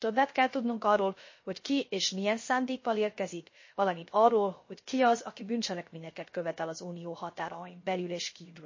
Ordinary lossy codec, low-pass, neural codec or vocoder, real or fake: MP3, 32 kbps; 7.2 kHz; codec, 16 kHz, 0.3 kbps, FocalCodec; fake